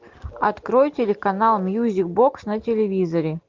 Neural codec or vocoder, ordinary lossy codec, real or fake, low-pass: none; Opus, 32 kbps; real; 7.2 kHz